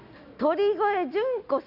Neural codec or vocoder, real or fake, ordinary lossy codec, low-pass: none; real; none; 5.4 kHz